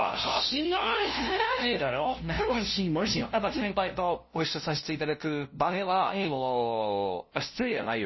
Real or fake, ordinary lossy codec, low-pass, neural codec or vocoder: fake; MP3, 24 kbps; 7.2 kHz; codec, 16 kHz, 0.5 kbps, FunCodec, trained on LibriTTS, 25 frames a second